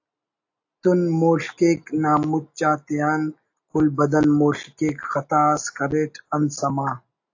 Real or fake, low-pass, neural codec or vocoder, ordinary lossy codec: real; 7.2 kHz; none; AAC, 48 kbps